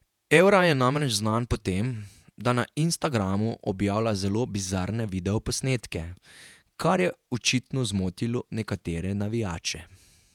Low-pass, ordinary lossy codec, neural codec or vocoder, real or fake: 19.8 kHz; none; vocoder, 48 kHz, 128 mel bands, Vocos; fake